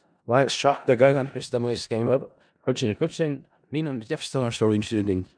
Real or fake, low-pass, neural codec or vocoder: fake; 9.9 kHz; codec, 16 kHz in and 24 kHz out, 0.4 kbps, LongCat-Audio-Codec, four codebook decoder